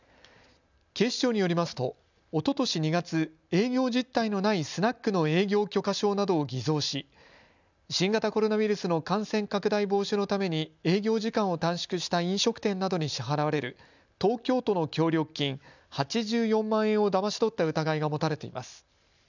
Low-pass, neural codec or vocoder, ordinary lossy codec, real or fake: 7.2 kHz; none; none; real